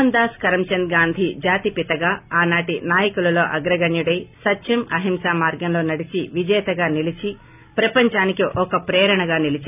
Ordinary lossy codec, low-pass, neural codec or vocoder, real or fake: none; 3.6 kHz; none; real